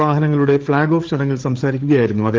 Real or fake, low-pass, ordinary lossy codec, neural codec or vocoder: fake; 7.2 kHz; Opus, 16 kbps; codec, 16 kHz, 8 kbps, FreqCodec, larger model